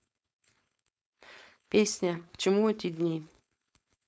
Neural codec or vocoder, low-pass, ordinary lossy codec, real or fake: codec, 16 kHz, 4.8 kbps, FACodec; none; none; fake